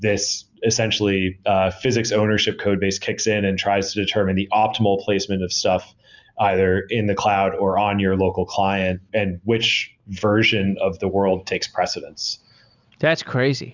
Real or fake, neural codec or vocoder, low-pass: real; none; 7.2 kHz